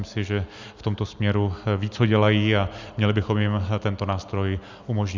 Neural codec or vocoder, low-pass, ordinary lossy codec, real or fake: none; 7.2 kHz; Opus, 64 kbps; real